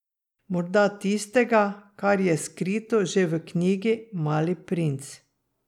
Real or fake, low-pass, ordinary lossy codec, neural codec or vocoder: real; 19.8 kHz; none; none